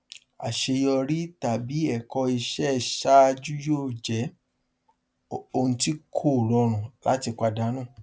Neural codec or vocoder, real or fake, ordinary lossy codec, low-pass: none; real; none; none